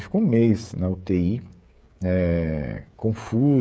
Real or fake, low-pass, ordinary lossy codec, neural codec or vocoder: fake; none; none; codec, 16 kHz, 16 kbps, FreqCodec, smaller model